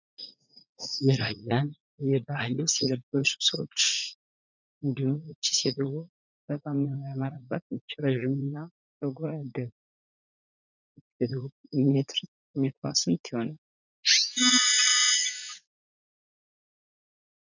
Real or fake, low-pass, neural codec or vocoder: fake; 7.2 kHz; vocoder, 44.1 kHz, 80 mel bands, Vocos